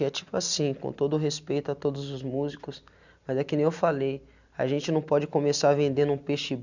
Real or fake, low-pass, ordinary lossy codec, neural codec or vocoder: real; 7.2 kHz; none; none